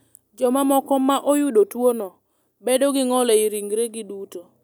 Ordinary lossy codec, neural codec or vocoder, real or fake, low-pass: none; none; real; 19.8 kHz